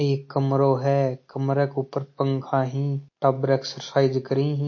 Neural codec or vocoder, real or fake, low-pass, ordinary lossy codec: none; real; 7.2 kHz; MP3, 32 kbps